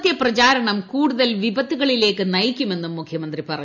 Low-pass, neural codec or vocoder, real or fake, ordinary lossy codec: 7.2 kHz; none; real; none